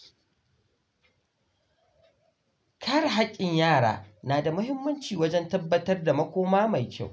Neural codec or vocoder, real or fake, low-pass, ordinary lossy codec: none; real; none; none